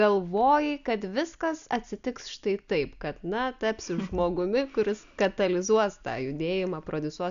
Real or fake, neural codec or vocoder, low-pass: real; none; 7.2 kHz